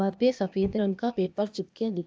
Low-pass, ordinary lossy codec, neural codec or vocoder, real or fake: none; none; codec, 16 kHz, 0.8 kbps, ZipCodec; fake